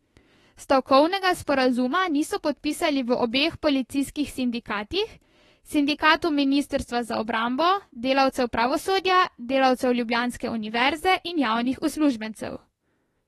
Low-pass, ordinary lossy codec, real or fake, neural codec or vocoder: 19.8 kHz; AAC, 32 kbps; fake; autoencoder, 48 kHz, 32 numbers a frame, DAC-VAE, trained on Japanese speech